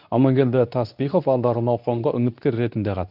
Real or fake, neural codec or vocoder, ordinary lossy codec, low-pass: fake; codec, 24 kHz, 0.9 kbps, WavTokenizer, medium speech release version 2; none; 5.4 kHz